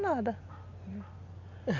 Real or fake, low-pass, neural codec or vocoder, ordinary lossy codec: real; 7.2 kHz; none; none